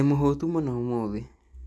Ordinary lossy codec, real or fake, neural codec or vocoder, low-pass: none; real; none; none